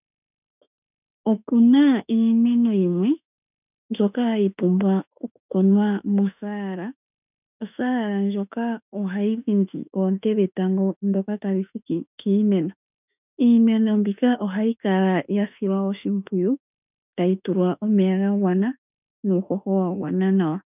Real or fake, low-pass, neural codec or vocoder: fake; 3.6 kHz; autoencoder, 48 kHz, 32 numbers a frame, DAC-VAE, trained on Japanese speech